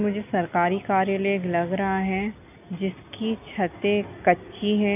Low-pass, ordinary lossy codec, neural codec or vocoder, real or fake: 3.6 kHz; none; none; real